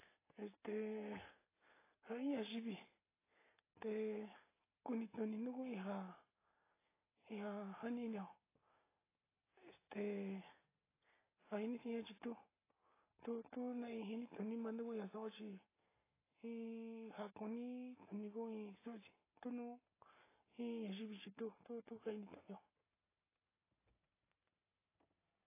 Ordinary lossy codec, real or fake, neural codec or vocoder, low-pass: AAC, 16 kbps; real; none; 3.6 kHz